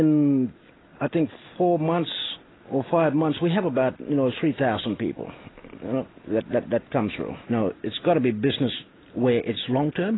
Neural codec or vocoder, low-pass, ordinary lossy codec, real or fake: none; 7.2 kHz; AAC, 16 kbps; real